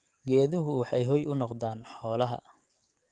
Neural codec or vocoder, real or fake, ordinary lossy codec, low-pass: codec, 44.1 kHz, 7.8 kbps, DAC; fake; Opus, 32 kbps; 9.9 kHz